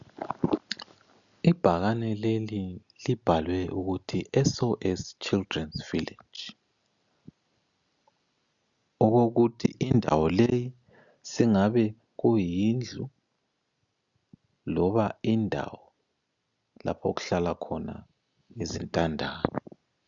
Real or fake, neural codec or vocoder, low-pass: real; none; 7.2 kHz